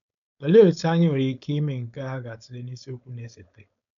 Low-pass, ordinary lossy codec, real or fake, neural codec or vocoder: 7.2 kHz; none; fake; codec, 16 kHz, 4.8 kbps, FACodec